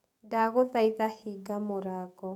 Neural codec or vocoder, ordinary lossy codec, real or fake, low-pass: codec, 44.1 kHz, 7.8 kbps, DAC; none; fake; 19.8 kHz